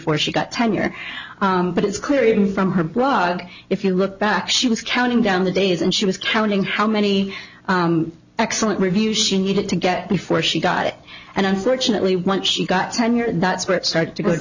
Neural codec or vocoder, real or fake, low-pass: none; real; 7.2 kHz